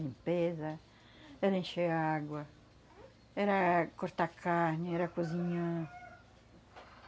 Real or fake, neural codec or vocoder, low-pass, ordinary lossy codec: real; none; none; none